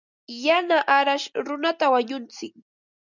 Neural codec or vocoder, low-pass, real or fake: none; 7.2 kHz; real